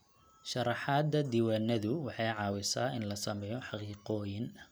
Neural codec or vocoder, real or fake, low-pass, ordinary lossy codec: none; real; none; none